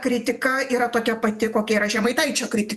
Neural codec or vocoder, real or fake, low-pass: vocoder, 48 kHz, 128 mel bands, Vocos; fake; 14.4 kHz